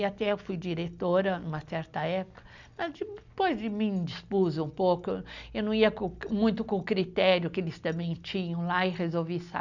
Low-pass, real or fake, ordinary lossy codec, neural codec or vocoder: 7.2 kHz; real; none; none